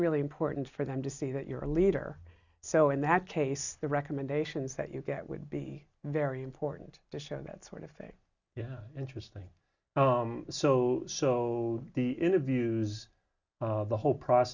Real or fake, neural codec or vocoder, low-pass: real; none; 7.2 kHz